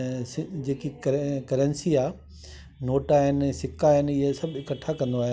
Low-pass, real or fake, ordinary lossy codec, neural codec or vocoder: none; real; none; none